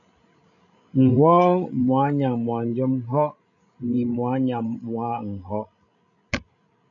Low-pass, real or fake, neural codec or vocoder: 7.2 kHz; fake; codec, 16 kHz, 16 kbps, FreqCodec, larger model